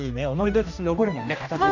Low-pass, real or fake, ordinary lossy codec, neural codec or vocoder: 7.2 kHz; fake; none; codec, 16 kHz, 1 kbps, X-Codec, HuBERT features, trained on general audio